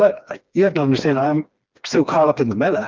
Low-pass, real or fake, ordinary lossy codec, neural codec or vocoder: 7.2 kHz; fake; Opus, 32 kbps; codec, 16 kHz, 2 kbps, FreqCodec, smaller model